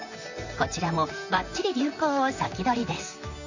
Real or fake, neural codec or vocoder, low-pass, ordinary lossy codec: fake; vocoder, 44.1 kHz, 128 mel bands, Pupu-Vocoder; 7.2 kHz; none